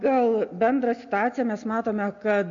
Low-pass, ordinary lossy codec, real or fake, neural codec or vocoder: 7.2 kHz; AAC, 64 kbps; real; none